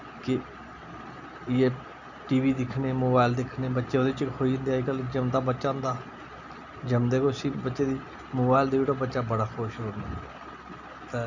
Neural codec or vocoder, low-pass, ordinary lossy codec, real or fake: none; 7.2 kHz; none; real